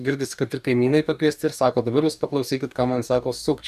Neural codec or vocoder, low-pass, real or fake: codec, 44.1 kHz, 2.6 kbps, DAC; 14.4 kHz; fake